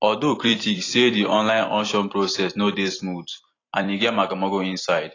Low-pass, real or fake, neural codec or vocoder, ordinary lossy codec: 7.2 kHz; fake; vocoder, 44.1 kHz, 128 mel bands every 256 samples, BigVGAN v2; AAC, 32 kbps